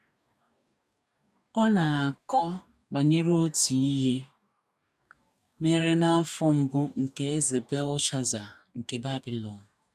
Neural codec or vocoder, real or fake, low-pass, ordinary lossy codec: codec, 44.1 kHz, 2.6 kbps, DAC; fake; 14.4 kHz; none